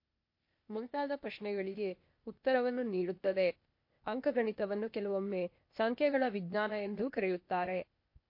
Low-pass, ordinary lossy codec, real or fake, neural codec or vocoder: 5.4 kHz; MP3, 32 kbps; fake; codec, 16 kHz, 0.8 kbps, ZipCodec